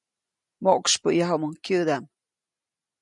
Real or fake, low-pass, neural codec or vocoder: real; 10.8 kHz; none